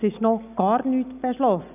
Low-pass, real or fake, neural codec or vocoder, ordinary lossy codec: 3.6 kHz; real; none; none